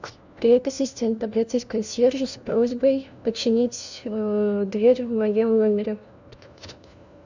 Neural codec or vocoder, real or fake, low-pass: codec, 16 kHz, 1 kbps, FunCodec, trained on LibriTTS, 50 frames a second; fake; 7.2 kHz